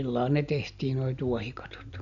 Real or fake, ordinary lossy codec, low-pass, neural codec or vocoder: real; none; 7.2 kHz; none